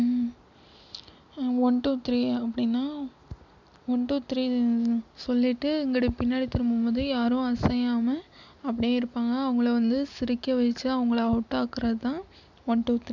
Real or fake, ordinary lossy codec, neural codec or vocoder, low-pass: real; none; none; 7.2 kHz